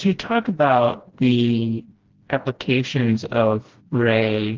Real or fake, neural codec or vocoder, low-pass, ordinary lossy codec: fake; codec, 16 kHz, 1 kbps, FreqCodec, smaller model; 7.2 kHz; Opus, 16 kbps